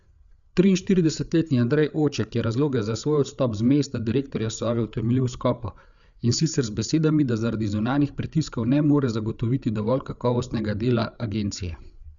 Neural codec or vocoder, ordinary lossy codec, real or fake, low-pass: codec, 16 kHz, 8 kbps, FreqCodec, larger model; none; fake; 7.2 kHz